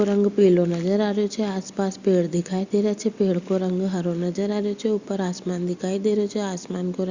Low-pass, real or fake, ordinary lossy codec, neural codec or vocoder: 7.2 kHz; real; Opus, 64 kbps; none